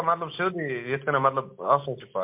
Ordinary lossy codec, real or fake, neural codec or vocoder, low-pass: MP3, 32 kbps; real; none; 3.6 kHz